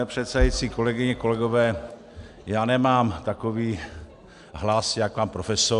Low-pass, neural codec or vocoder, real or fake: 10.8 kHz; none; real